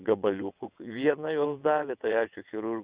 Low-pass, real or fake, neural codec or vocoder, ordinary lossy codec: 3.6 kHz; fake; vocoder, 22.05 kHz, 80 mel bands, WaveNeXt; Opus, 64 kbps